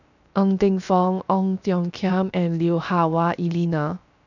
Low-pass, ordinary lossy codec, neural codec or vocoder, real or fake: 7.2 kHz; none; codec, 16 kHz, 0.7 kbps, FocalCodec; fake